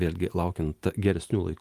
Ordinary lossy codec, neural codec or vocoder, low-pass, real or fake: Opus, 32 kbps; none; 14.4 kHz; real